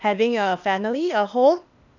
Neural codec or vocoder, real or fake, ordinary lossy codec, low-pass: codec, 16 kHz, 0.8 kbps, ZipCodec; fake; none; 7.2 kHz